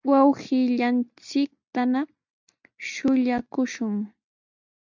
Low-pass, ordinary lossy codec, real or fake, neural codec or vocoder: 7.2 kHz; MP3, 64 kbps; real; none